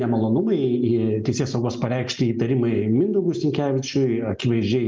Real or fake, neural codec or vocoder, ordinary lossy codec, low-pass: real; none; Opus, 24 kbps; 7.2 kHz